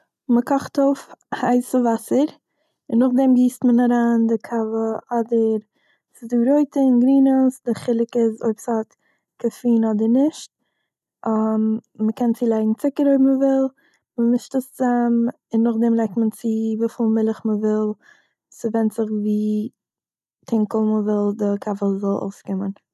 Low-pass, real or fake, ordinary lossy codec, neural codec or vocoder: 14.4 kHz; real; none; none